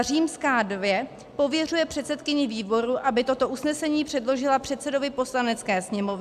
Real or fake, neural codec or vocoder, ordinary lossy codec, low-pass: real; none; AAC, 96 kbps; 14.4 kHz